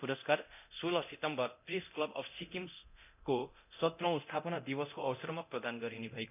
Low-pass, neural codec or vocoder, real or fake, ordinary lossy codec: 3.6 kHz; codec, 24 kHz, 0.9 kbps, DualCodec; fake; none